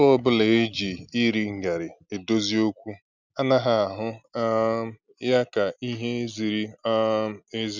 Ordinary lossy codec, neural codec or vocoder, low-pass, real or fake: none; none; 7.2 kHz; real